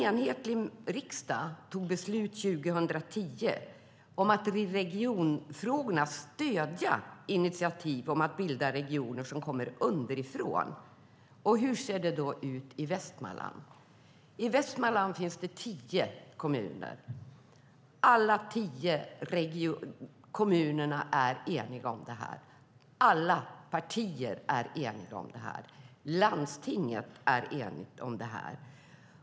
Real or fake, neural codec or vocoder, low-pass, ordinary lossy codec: real; none; none; none